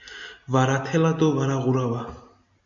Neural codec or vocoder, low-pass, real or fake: none; 7.2 kHz; real